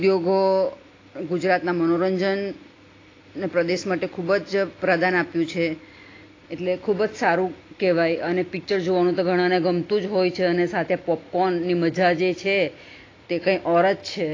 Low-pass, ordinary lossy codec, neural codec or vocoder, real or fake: 7.2 kHz; AAC, 32 kbps; none; real